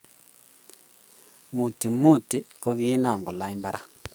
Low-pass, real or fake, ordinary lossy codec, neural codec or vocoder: none; fake; none; codec, 44.1 kHz, 2.6 kbps, SNAC